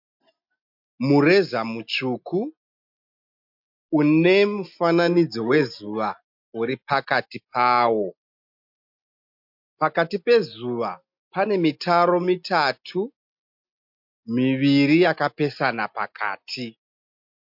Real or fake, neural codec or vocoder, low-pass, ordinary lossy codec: real; none; 5.4 kHz; MP3, 48 kbps